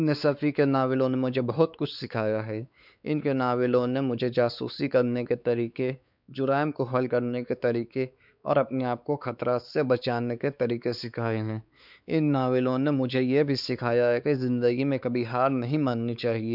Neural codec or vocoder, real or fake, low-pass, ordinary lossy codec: codec, 16 kHz, 4 kbps, X-Codec, WavLM features, trained on Multilingual LibriSpeech; fake; 5.4 kHz; none